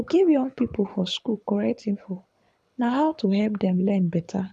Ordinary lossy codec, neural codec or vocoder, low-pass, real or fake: none; codec, 24 kHz, 6 kbps, HILCodec; none; fake